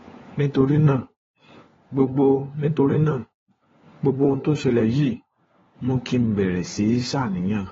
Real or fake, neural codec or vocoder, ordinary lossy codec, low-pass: fake; codec, 16 kHz, 4 kbps, FunCodec, trained on LibriTTS, 50 frames a second; AAC, 24 kbps; 7.2 kHz